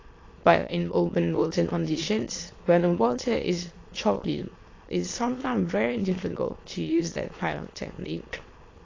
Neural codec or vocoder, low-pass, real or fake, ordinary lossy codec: autoencoder, 22.05 kHz, a latent of 192 numbers a frame, VITS, trained on many speakers; 7.2 kHz; fake; AAC, 32 kbps